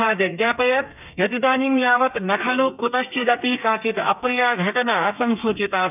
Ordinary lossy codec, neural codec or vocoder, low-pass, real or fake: none; codec, 32 kHz, 1.9 kbps, SNAC; 3.6 kHz; fake